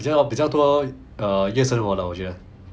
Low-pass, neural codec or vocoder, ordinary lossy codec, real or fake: none; none; none; real